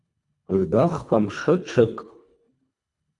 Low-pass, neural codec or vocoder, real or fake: 10.8 kHz; codec, 24 kHz, 1.5 kbps, HILCodec; fake